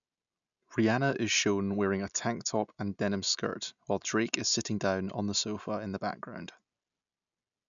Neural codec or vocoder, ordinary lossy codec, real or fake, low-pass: none; none; real; 7.2 kHz